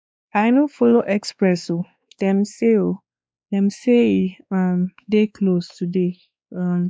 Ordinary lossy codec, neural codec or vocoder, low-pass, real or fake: none; codec, 16 kHz, 2 kbps, X-Codec, WavLM features, trained on Multilingual LibriSpeech; none; fake